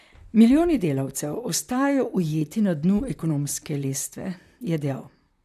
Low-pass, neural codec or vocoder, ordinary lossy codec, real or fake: 14.4 kHz; vocoder, 44.1 kHz, 128 mel bands, Pupu-Vocoder; none; fake